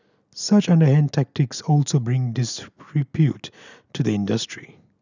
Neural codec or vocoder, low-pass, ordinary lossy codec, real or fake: none; 7.2 kHz; none; real